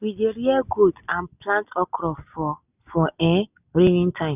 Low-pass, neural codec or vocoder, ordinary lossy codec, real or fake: 3.6 kHz; none; none; real